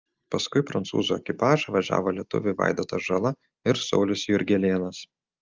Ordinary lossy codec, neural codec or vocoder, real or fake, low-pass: Opus, 24 kbps; none; real; 7.2 kHz